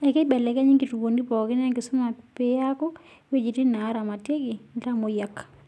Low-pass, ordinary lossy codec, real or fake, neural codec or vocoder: none; none; real; none